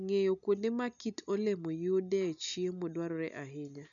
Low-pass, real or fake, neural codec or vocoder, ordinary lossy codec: 7.2 kHz; real; none; none